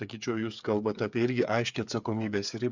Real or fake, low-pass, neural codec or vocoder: fake; 7.2 kHz; codec, 16 kHz, 8 kbps, FreqCodec, smaller model